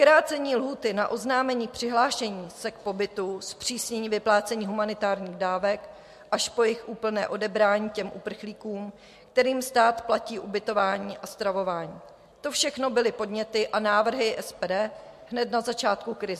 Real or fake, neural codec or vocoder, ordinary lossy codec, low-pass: real; none; MP3, 64 kbps; 14.4 kHz